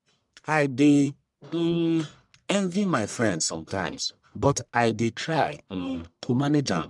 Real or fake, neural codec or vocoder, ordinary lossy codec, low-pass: fake; codec, 44.1 kHz, 1.7 kbps, Pupu-Codec; MP3, 96 kbps; 10.8 kHz